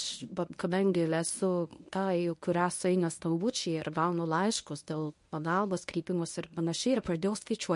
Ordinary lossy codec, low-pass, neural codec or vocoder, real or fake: MP3, 48 kbps; 10.8 kHz; codec, 24 kHz, 0.9 kbps, WavTokenizer, medium speech release version 1; fake